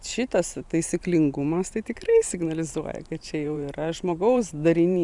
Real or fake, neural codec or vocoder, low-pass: fake; vocoder, 44.1 kHz, 128 mel bands every 512 samples, BigVGAN v2; 10.8 kHz